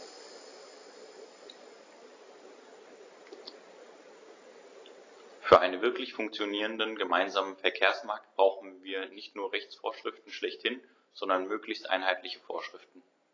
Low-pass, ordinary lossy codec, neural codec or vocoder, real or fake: 7.2 kHz; AAC, 32 kbps; none; real